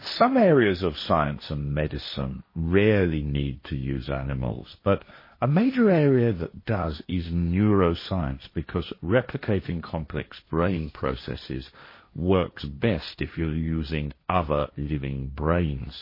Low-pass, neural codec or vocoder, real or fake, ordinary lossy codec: 5.4 kHz; codec, 16 kHz, 1.1 kbps, Voila-Tokenizer; fake; MP3, 24 kbps